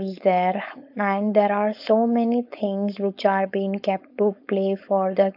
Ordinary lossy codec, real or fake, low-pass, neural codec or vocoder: AAC, 48 kbps; fake; 5.4 kHz; codec, 16 kHz, 4.8 kbps, FACodec